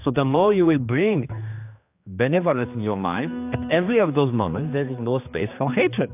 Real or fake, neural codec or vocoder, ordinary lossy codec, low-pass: fake; codec, 16 kHz, 2 kbps, X-Codec, HuBERT features, trained on general audio; AAC, 32 kbps; 3.6 kHz